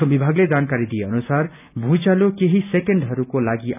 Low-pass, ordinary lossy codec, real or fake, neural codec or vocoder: 3.6 kHz; none; real; none